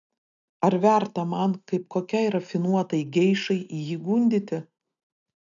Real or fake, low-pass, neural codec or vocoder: real; 7.2 kHz; none